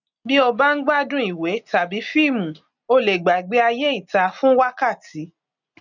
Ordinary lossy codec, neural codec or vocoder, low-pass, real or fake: none; none; 7.2 kHz; real